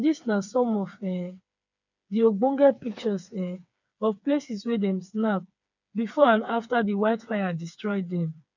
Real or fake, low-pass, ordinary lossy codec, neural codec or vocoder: fake; 7.2 kHz; none; codec, 16 kHz, 4 kbps, FreqCodec, smaller model